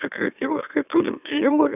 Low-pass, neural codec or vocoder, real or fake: 3.6 kHz; autoencoder, 44.1 kHz, a latent of 192 numbers a frame, MeloTTS; fake